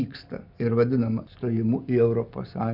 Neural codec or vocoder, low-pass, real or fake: none; 5.4 kHz; real